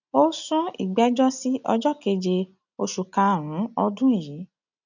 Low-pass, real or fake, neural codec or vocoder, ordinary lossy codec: 7.2 kHz; real; none; none